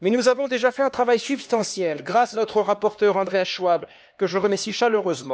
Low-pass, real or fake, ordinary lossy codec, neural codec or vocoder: none; fake; none; codec, 16 kHz, 2 kbps, X-Codec, HuBERT features, trained on LibriSpeech